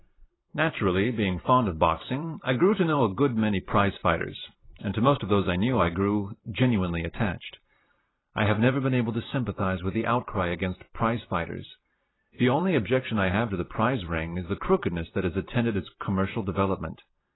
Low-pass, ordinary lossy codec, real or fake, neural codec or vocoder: 7.2 kHz; AAC, 16 kbps; fake; vocoder, 44.1 kHz, 128 mel bands every 512 samples, BigVGAN v2